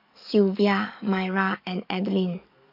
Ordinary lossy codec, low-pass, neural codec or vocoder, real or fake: none; 5.4 kHz; codec, 44.1 kHz, 7.8 kbps, DAC; fake